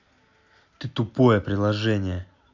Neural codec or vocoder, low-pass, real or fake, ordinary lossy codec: none; 7.2 kHz; real; AAC, 48 kbps